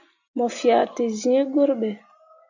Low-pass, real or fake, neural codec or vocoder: 7.2 kHz; real; none